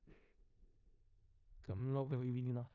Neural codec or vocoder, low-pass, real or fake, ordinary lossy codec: codec, 16 kHz in and 24 kHz out, 0.4 kbps, LongCat-Audio-Codec, four codebook decoder; 7.2 kHz; fake; none